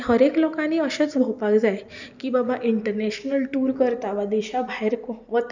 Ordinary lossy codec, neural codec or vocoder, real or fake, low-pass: none; none; real; 7.2 kHz